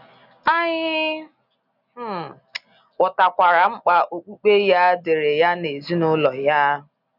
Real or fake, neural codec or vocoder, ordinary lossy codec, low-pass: real; none; none; 5.4 kHz